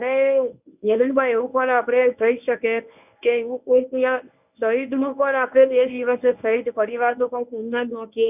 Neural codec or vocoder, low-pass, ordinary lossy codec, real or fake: codec, 24 kHz, 0.9 kbps, WavTokenizer, medium speech release version 1; 3.6 kHz; none; fake